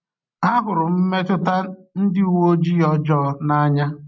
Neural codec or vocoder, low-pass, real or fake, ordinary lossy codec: none; 7.2 kHz; real; MP3, 48 kbps